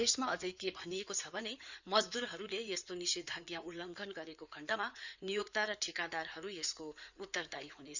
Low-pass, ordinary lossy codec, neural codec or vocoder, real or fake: 7.2 kHz; none; codec, 16 kHz in and 24 kHz out, 2.2 kbps, FireRedTTS-2 codec; fake